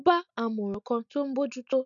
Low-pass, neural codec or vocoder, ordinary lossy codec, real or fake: 7.2 kHz; none; none; real